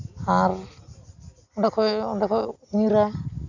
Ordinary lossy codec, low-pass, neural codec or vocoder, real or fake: none; 7.2 kHz; none; real